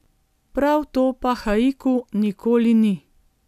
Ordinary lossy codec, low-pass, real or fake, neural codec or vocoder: none; 14.4 kHz; real; none